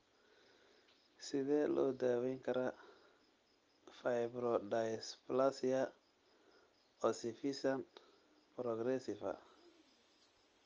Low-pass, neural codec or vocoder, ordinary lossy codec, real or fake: 7.2 kHz; none; Opus, 24 kbps; real